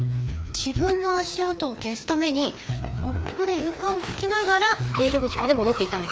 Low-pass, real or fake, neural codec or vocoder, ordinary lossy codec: none; fake; codec, 16 kHz, 2 kbps, FreqCodec, larger model; none